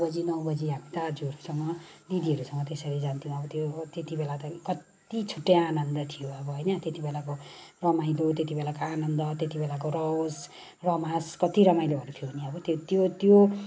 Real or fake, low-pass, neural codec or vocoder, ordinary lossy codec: real; none; none; none